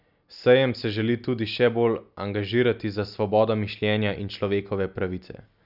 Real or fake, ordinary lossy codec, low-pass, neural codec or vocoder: real; none; 5.4 kHz; none